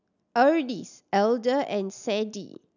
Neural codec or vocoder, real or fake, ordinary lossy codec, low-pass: none; real; none; 7.2 kHz